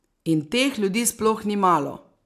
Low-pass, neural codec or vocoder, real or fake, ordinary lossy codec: 14.4 kHz; none; real; AAC, 96 kbps